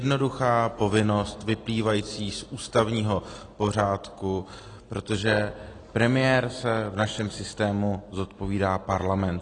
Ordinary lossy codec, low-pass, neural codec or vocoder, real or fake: AAC, 32 kbps; 9.9 kHz; none; real